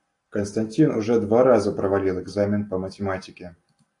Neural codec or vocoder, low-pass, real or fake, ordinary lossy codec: none; 10.8 kHz; real; AAC, 48 kbps